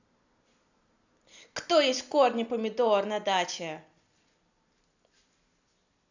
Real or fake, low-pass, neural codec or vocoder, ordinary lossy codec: real; 7.2 kHz; none; none